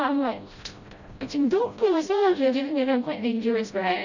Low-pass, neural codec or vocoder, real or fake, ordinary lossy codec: 7.2 kHz; codec, 16 kHz, 0.5 kbps, FreqCodec, smaller model; fake; none